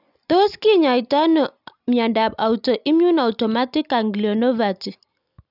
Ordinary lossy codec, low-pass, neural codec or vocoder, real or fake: none; 5.4 kHz; none; real